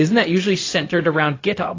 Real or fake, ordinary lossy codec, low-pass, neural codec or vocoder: fake; AAC, 32 kbps; 7.2 kHz; codec, 16 kHz, 0.4 kbps, LongCat-Audio-Codec